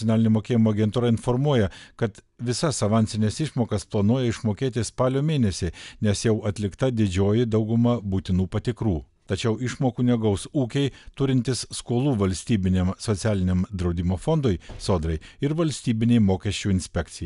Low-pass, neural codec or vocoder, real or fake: 10.8 kHz; none; real